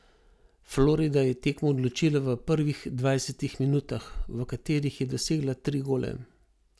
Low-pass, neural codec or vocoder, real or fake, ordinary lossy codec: none; none; real; none